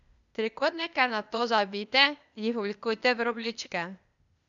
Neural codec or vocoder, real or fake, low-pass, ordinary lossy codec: codec, 16 kHz, 0.8 kbps, ZipCodec; fake; 7.2 kHz; none